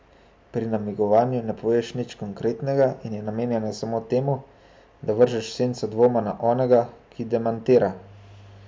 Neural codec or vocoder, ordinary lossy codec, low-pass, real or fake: none; none; none; real